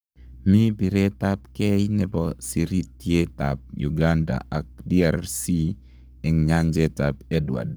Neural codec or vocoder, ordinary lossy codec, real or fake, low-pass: codec, 44.1 kHz, 7.8 kbps, Pupu-Codec; none; fake; none